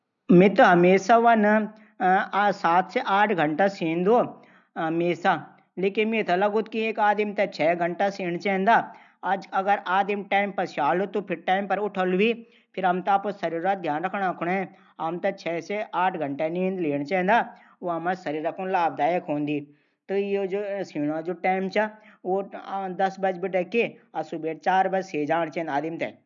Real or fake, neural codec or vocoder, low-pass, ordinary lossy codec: real; none; 7.2 kHz; none